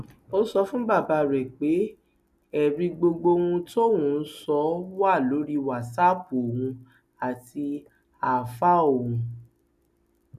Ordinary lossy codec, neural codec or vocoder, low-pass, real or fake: MP3, 96 kbps; none; 14.4 kHz; real